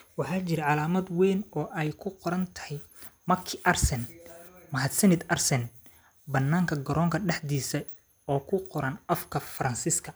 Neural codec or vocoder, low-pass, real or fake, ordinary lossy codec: none; none; real; none